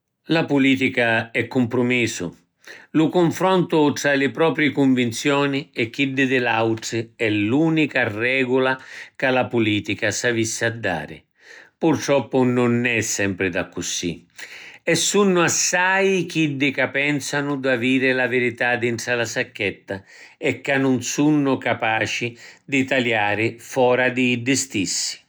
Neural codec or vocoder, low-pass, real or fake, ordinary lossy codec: none; none; real; none